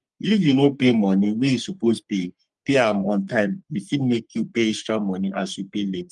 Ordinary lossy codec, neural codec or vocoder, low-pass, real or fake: Opus, 32 kbps; codec, 44.1 kHz, 3.4 kbps, Pupu-Codec; 10.8 kHz; fake